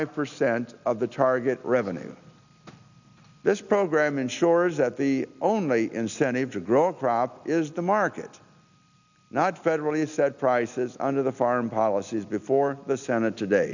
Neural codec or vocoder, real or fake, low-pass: none; real; 7.2 kHz